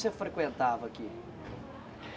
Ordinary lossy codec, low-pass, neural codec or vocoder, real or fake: none; none; none; real